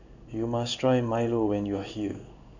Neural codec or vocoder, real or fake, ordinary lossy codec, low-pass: codec, 16 kHz in and 24 kHz out, 1 kbps, XY-Tokenizer; fake; none; 7.2 kHz